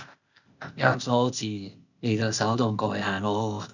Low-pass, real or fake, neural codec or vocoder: 7.2 kHz; fake; codec, 16 kHz, 0.8 kbps, ZipCodec